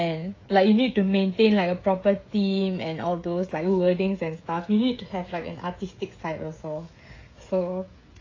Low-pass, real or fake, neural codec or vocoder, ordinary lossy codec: 7.2 kHz; fake; codec, 16 kHz, 16 kbps, FreqCodec, smaller model; AAC, 32 kbps